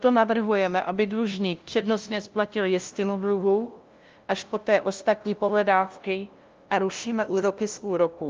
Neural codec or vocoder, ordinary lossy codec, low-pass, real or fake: codec, 16 kHz, 0.5 kbps, FunCodec, trained on LibriTTS, 25 frames a second; Opus, 32 kbps; 7.2 kHz; fake